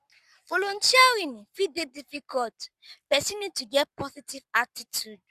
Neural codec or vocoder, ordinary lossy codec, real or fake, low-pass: codec, 44.1 kHz, 7.8 kbps, Pupu-Codec; Opus, 64 kbps; fake; 14.4 kHz